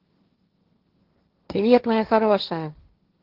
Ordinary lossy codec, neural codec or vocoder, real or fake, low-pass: Opus, 16 kbps; codec, 16 kHz, 1.1 kbps, Voila-Tokenizer; fake; 5.4 kHz